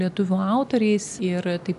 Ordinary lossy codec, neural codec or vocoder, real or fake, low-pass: AAC, 96 kbps; none; real; 10.8 kHz